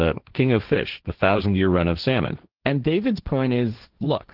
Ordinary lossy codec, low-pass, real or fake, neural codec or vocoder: Opus, 16 kbps; 5.4 kHz; fake; codec, 16 kHz, 1.1 kbps, Voila-Tokenizer